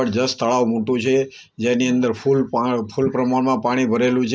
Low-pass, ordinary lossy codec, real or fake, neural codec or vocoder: none; none; real; none